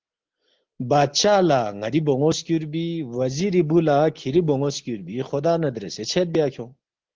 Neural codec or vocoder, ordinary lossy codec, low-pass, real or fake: none; Opus, 16 kbps; 7.2 kHz; real